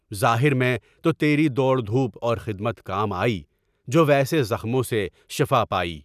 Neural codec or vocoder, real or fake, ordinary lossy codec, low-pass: none; real; none; 14.4 kHz